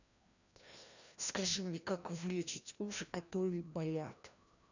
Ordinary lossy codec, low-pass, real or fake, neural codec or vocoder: none; 7.2 kHz; fake; codec, 16 kHz, 1 kbps, FreqCodec, larger model